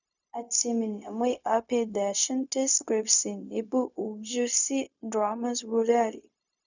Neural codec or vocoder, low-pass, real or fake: codec, 16 kHz, 0.4 kbps, LongCat-Audio-Codec; 7.2 kHz; fake